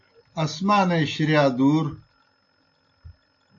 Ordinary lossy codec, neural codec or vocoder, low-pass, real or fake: AAC, 48 kbps; none; 7.2 kHz; real